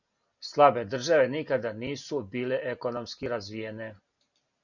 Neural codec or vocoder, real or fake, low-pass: none; real; 7.2 kHz